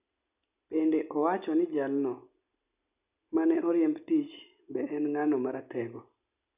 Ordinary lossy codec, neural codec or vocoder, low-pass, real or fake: none; none; 3.6 kHz; real